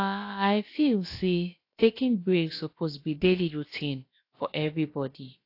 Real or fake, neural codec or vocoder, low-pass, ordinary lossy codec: fake; codec, 16 kHz, about 1 kbps, DyCAST, with the encoder's durations; 5.4 kHz; AAC, 32 kbps